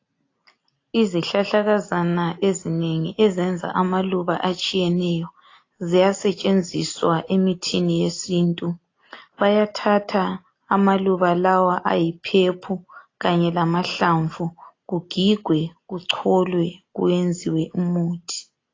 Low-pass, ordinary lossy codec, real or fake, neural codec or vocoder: 7.2 kHz; AAC, 32 kbps; real; none